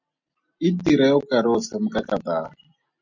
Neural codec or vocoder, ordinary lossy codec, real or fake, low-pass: none; MP3, 48 kbps; real; 7.2 kHz